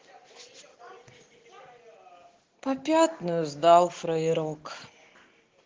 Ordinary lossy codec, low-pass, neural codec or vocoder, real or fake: Opus, 16 kbps; 7.2 kHz; none; real